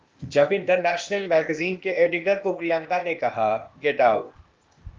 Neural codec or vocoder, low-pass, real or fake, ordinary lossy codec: codec, 16 kHz, 0.8 kbps, ZipCodec; 7.2 kHz; fake; Opus, 32 kbps